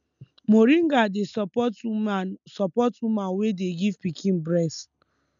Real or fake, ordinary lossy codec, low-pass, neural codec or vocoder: real; none; 7.2 kHz; none